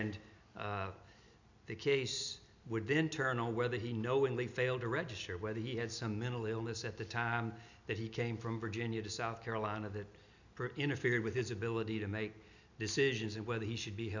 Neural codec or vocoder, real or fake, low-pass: none; real; 7.2 kHz